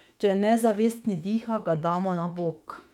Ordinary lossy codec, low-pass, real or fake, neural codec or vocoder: MP3, 96 kbps; 19.8 kHz; fake; autoencoder, 48 kHz, 32 numbers a frame, DAC-VAE, trained on Japanese speech